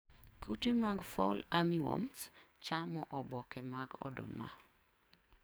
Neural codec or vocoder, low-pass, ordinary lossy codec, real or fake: codec, 44.1 kHz, 2.6 kbps, SNAC; none; none; fake